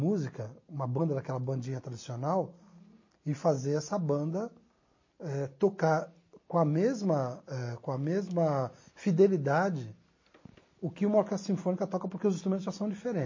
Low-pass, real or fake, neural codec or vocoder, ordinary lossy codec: 7.2 kHz; real; none; MP3, 32 kbps